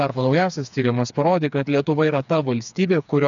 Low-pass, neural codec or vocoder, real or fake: 7.2 kHz; codec, 16 kHz, 4 kbps, FreqCodec, smaller model; fake